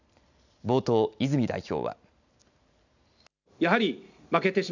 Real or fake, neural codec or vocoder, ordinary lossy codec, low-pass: real; none; none; 7.2 kHz